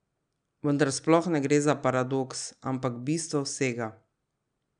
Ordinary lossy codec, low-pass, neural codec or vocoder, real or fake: none; 9.9 kHz; none; real